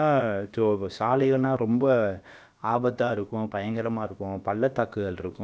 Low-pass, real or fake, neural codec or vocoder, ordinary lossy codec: none; fake; codec, 16 kHz, about 1 kbps, DyCAST, with the encoder's durations; none